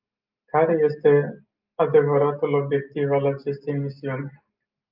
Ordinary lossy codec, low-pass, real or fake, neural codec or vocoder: Opus, 24 kbps; 5.4 kHz; real; none